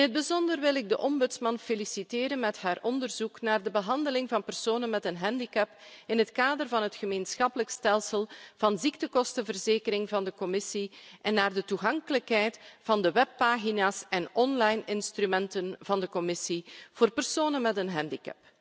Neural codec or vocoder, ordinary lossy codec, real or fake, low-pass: none; none; real; none